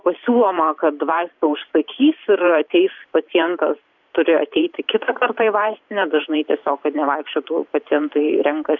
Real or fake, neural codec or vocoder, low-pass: fake; vocoder, 44.1 kHz, 128 mel bands every 512 samples, BigVGAN v2; 7.2 kHz